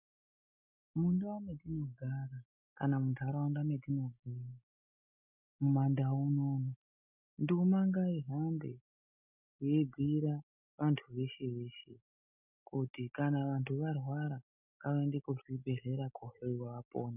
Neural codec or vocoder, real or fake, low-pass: none; real; 3.6 kHz